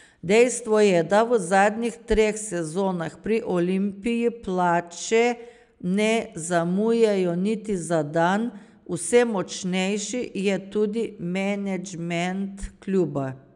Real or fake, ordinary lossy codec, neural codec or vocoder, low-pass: real; none; none; 10.8 kHz